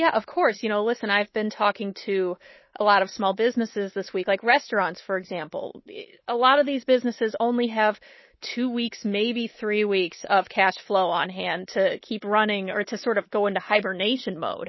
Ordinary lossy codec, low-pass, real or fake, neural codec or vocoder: MP3, 24 kbps; 7.2 kHz; fake; codec, 24 kHz, 3.1 kbps, DualCodec